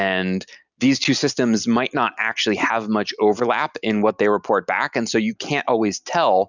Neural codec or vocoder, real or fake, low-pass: none; real; 7.2 kHz